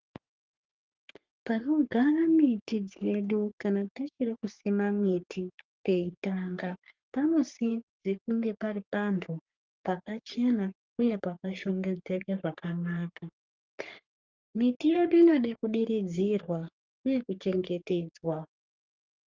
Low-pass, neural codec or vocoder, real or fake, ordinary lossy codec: 7.2 kHz; codec, 44.1 kHz, 3.4 kbps, Pupu-Codec; fake; Opus, 32 kbps